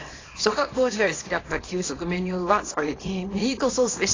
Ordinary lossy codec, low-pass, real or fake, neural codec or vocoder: AAC, 32 kbps; 7.2 kHz; fake; codec, 24 kHz, 0.9 kbps, WavTokenizer, small release